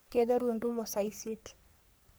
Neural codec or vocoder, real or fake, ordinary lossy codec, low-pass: codec, 44.1 kHz, 3.4 kbps, Pupu-Codec; fake; none; none